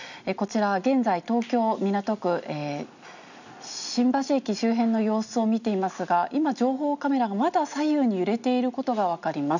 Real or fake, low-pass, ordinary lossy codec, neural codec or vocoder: real; 7.2 kHz; none; none